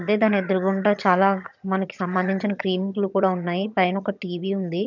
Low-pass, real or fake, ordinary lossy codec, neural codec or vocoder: 7.2 kHz; fake; none; vocoder, 22.05 kHz, 80 mel bands, HiFi-GAN